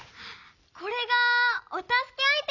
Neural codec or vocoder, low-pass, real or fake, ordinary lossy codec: none; 7.2 kHz; real; none